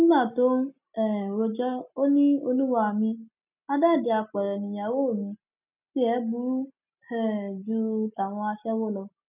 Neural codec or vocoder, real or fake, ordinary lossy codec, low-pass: none; real; none; 3.6 kHz